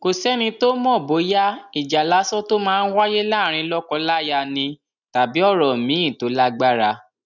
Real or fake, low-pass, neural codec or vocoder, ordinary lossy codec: real; 7.2 kHz; none; none